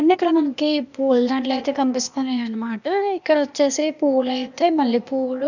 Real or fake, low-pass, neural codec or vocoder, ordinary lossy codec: fake; 7.2 kHz; codec, 16 kHz, 0.8 kbps, ZipCodec; none